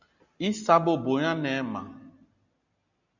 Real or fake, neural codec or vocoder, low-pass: real; none; 7.2 kHz